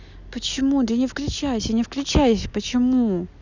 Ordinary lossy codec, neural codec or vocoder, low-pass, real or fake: none; none; 7.2 kHz; real